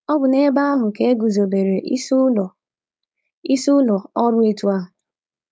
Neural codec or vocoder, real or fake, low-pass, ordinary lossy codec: codec, 16 kHz, 4.8 kbps, FACodec; fake; none; none